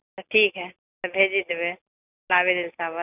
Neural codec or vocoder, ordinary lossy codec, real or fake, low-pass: none; none; real; 3.6 kHz